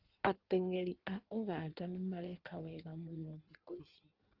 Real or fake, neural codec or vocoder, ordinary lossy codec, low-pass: fake; codec, 16 kHz in and 24 kHz out, 1.1 kbps, FireRedTTS-2 codec; Opus, 16 kbps; 5.4 kHz